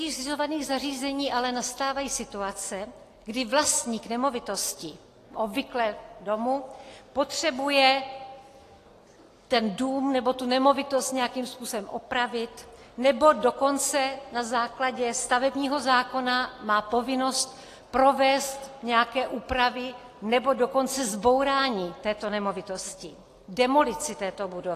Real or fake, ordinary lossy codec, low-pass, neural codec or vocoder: real; AAC, 48 kbps; 14.4 kHz; none